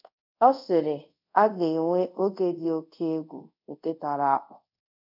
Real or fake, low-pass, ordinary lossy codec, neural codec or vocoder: fake; 5.4 kHz; none; codec, 24 kHz, 0.5 kbps, DualCodec